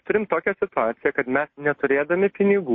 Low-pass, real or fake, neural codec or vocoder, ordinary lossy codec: 7.2 kHz; real; none; MP3, 32 kbps